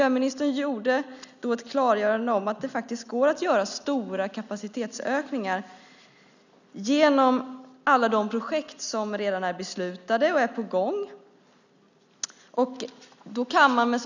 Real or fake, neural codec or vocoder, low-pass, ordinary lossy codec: real; none; 7.2 kHz; none